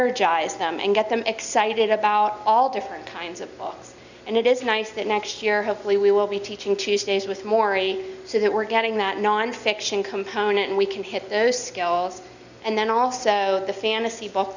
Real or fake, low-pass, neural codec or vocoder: real; 7.2 kHz; none